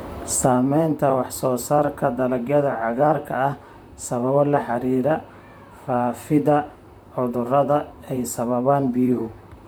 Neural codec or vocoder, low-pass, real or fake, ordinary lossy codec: vocoder, 44.1 kHz, 128 mel bands, Pupu-Vocoder; none; fake; none